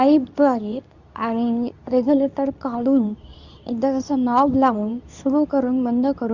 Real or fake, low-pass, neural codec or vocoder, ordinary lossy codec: fake; 7.2 kHz; codec, 24 kHz, 0.9 kbps, WavTokenizer, medium speech release version 2; none